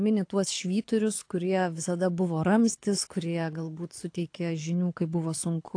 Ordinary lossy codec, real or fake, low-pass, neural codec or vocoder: AAC, 48 kbps; fake; 9.9 kHz; codec, 24 kHz, 6 kbps, HILCodec